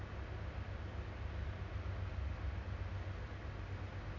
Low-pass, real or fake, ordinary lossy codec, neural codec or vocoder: 7.2 kHz; real; none; none